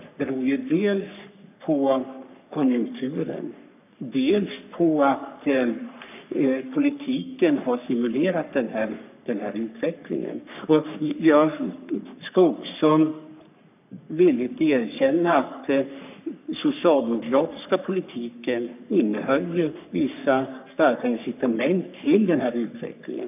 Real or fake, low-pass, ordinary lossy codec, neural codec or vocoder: fake; 3.6 kHz; none; codec, 44.1 kHz, 3.4 kbps, Pupu-Codec